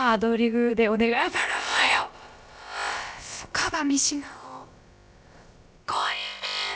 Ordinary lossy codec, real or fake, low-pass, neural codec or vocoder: none; fake; none; codec, 16 kHz, about 1 kbps, DyCAST, with the encoder's durations